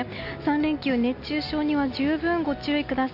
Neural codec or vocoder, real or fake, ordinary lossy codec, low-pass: none; real; none; 5.4 kHz